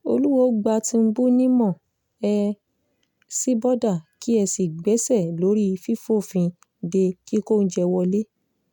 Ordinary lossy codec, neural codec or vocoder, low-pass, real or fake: none; none; none; real